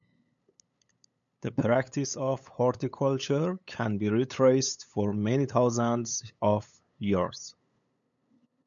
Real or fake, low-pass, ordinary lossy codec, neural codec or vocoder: fake; 7.2 kHz; MP3, 96 kbps; codec, 16 kHz, 8 kbps, FunCodec, trained on LibriTTS, 25 frames a second